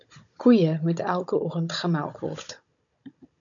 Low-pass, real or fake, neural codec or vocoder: 7.2 kHz; fake; codec, 16 kHz, 16 kbps, FunCodec, trained on Chinese and English, 50 frames a second